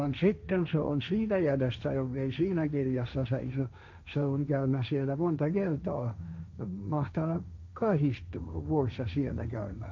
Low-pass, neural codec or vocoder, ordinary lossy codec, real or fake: 7.2 kHz; codec, 16 kHz, 1.1 kbps, Voila-Tokenizer; none; fake